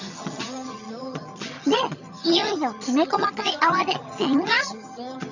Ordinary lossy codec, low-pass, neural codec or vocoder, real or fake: none; 7.2 kHz; vocoder, 22.05 kHz, 80 mel bands, HiFi-GAN; fake